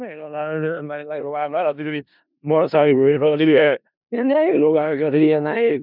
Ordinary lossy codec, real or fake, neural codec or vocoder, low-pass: none; fake; codec, 16 kHz in and 24 kHz out, 0.4 kbps, LongCat-Audio-Codec, four codebook decoder; 5.4 kHz